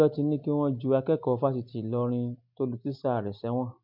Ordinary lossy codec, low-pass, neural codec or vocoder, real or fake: MP3, 48 kbps; 5.4 kHz; none; real